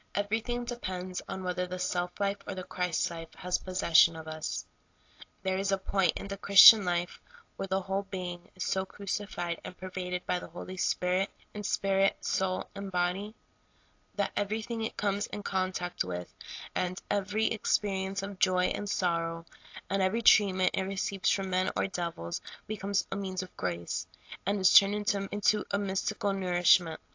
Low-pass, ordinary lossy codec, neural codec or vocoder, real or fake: 7.2 kHz; AAC, 48 kbps; none; real